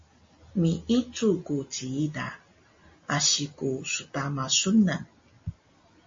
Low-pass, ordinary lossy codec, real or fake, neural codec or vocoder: 7.2 kHz; MP3, 32 kbps; real; none